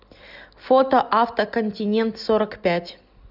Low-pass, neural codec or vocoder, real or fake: 5.4 kHz; none; real